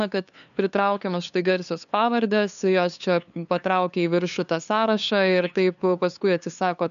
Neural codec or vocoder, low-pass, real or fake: codec, 16 kHz, 2 kbps, FunCodec, trained on LibriTTS, 25 frames a second; 7.2 kHz; fake